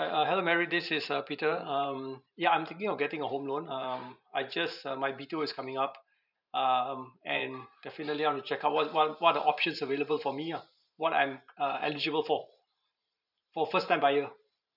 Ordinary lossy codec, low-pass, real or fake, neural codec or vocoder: none; 5.4 kHz; fake; vocoder, 44.1 kHz, 128 mel bands every 512 samples, BigVGAN v2